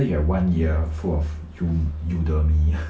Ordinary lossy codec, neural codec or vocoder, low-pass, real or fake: none; none; none; real